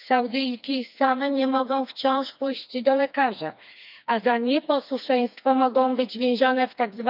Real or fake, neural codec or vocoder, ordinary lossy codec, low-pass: fake; codec, 16 kHz, 2 kbps, FreqCodec, smaller model; none; 5.4 kHz